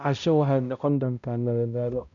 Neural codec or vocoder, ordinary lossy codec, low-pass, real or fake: codec, 16 kHz, 0.5 kbps, X-Codec, HuBERT features, trained on balanced general audio; none; 7.2 kHz; fake